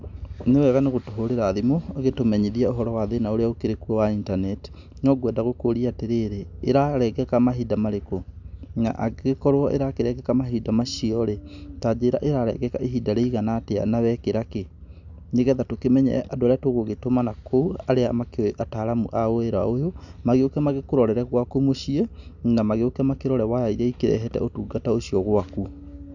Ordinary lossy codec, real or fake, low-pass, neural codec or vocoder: none; real; 7.2 kHz; none